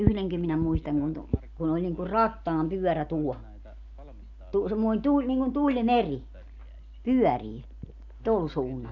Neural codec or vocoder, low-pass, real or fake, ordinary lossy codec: none; 7.2 kHz; real; none